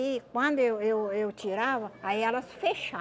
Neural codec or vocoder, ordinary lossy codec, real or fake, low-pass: none; none; real; none